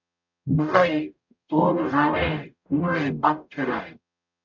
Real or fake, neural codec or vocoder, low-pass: fake; codec, 44.1 kHz, 0.9 kbps, DAC; 7.2 kHz